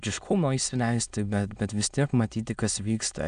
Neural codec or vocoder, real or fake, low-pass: autoencoder, 22.05 kHz, a latent of 192 numbers a frame, VITS, trained on many speakers; fake; 9.9 kHz